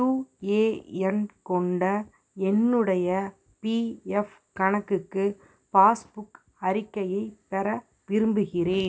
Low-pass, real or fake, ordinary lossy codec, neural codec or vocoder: none; real; none; none